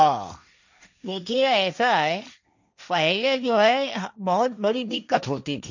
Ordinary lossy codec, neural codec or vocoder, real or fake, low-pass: none; codec, 16 kHz, 1.1 kbps, Voila-Tokenizer; fake; 7.2 kHz